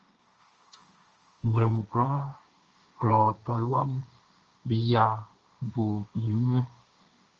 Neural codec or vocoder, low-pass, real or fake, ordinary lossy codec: codec, 16 kHz, 1.1 kbps, Voila-Tokenizer; 7.2 kHz; fake; Opus, 24 kbps